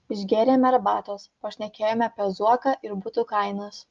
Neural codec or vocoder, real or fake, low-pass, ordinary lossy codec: none; real; 7.2 kHz; Opus, 24 kbps